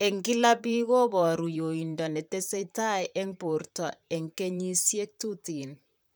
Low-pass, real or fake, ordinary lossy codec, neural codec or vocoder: none; fake; none; vocoder, 44.1 kHz, 128 mel bands, Pupu-Vocoder